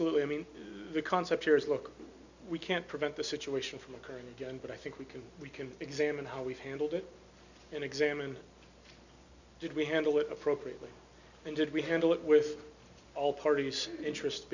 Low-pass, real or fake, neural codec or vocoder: 7.2 kHz; real; none